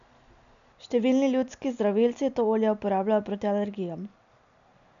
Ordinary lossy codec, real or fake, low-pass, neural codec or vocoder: none; real; 7.2 kHz; none